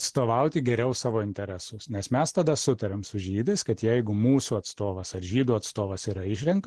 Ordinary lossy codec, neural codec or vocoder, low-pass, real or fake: Opus, 16 kbps; none; 10.8 kHz; real